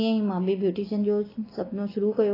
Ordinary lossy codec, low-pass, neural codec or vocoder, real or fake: AAC, 24 kbps; 5.4 kHz; none; real